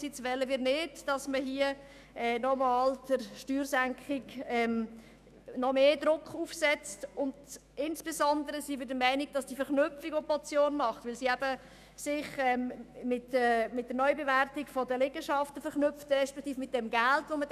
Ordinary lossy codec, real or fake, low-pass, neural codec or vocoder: none; fake; 14.4 kHz; autoencoder, 48 kHz, 128 numbers a frame, DAC-VAE, trained on Japanese speech